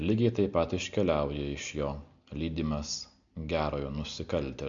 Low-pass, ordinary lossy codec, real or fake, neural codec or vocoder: 7.2 kHz; MP3, 64 kbps; real; none